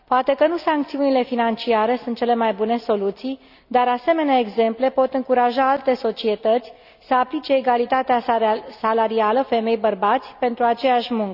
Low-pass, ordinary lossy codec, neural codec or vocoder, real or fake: 5.4 kHz; none; none; real